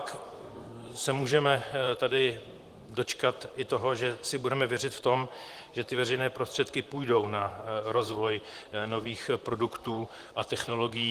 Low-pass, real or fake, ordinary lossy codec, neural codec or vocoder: 14.4 kHz; fake; Opus, 24 kbps; vocoder, 44.1 kHz, 128 mel bands, Pupu-Vocoder